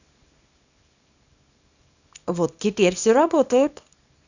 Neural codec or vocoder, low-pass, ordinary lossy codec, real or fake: codec, 24 kHz, 0.9 kbps, WavTokenizer, small release; 7.2 kHz; none; fake